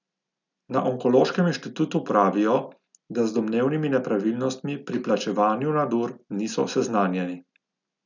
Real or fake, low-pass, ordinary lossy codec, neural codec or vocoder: real; 7.2 kHz; none; none